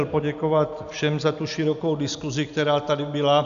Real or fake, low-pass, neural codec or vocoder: real; 7.2 kHz; none